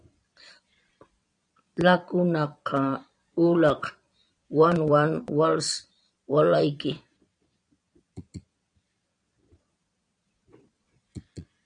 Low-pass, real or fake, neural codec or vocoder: 9.9 kHz; fake; vocoder, 22.05 kHz, 80 mel bands, Vocos